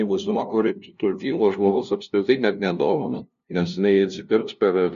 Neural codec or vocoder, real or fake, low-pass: codec, 16 kHz, 0.5 kbps, FunCodec, trained on LibriTTS, 25 frames a second; fake; 7.2 kHz